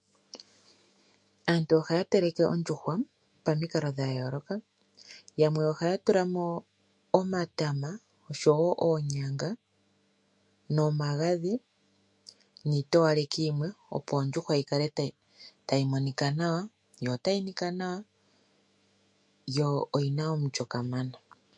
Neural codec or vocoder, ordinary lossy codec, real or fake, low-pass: autoencoder, 48 kHz, 128 numbers a frame, DAC-VAE, trained on Japanese speech; MP3, 48 kbps; fake; 10.8 kHz